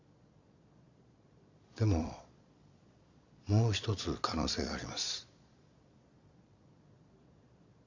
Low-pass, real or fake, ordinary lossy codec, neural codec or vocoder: 7.2 kHz; fake; Opus, 64 kbps; vocoder, 22.05 kHz, 80 mel bands, Vocos